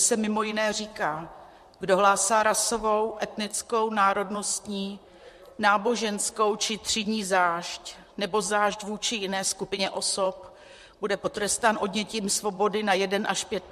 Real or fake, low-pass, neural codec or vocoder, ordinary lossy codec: fake; 14.4 kHz; vocoder, 44.1 kHz, 128 mel bands, Pupu-Vocoder; MP3, 64 kbps